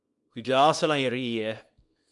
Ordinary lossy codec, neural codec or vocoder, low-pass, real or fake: MP3, 64 kbps; codec, 24 kHz, 0.9 kbps, WavTokenizer, small release; 10.8 kHz; fake